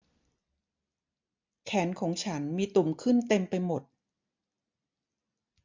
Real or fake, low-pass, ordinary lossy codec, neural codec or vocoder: real; 7.2 kHz; MP3, 64 kbps; none